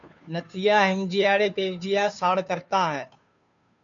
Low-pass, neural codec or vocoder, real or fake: 7.2 kHz; codec, 16 kHz, 2 kbps, FunCodec, trained on Chinese and English, 25 frames a second; fake